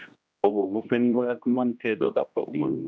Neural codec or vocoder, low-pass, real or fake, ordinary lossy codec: codec, 16 kHz, 1 kbps, X-Codec, HuBERT features, trained on general audio; none; fake; none